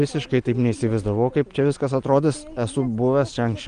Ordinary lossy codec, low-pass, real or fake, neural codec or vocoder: Opus, 24 kbps; 9.9 kHz; real; none